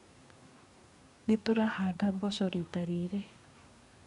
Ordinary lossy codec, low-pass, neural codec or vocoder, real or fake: none; 10.8 kHz; codec, 24 kHz, 1 kbps, SNAC; fake